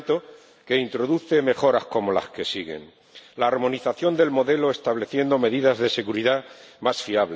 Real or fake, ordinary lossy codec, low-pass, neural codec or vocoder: real; none; none; none